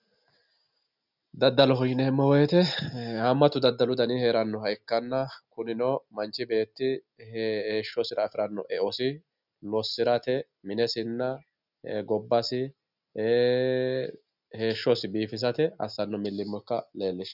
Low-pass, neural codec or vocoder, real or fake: 5.4 kHz; none; real